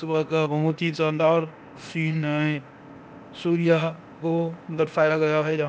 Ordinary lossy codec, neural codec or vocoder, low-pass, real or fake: none; codec, 16 kHz, 0.8 kbps, ZipCodec; none; fake